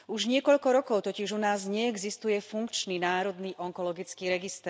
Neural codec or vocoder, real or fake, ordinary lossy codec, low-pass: none; real; none; none